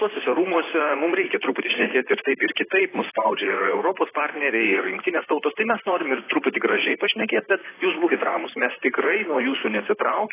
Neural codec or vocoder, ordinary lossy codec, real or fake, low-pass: vocoder, 44.1 kHz, 128 mel bands, Pupu-Vocoder; AAC, 16 kbps; fake; 3.6 kHz